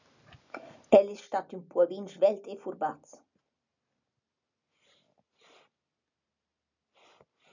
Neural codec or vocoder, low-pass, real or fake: none; 7.2 kHz; real